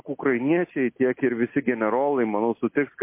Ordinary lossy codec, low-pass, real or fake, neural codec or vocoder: MP3, 24 kbps; 3.6 kHz; real; none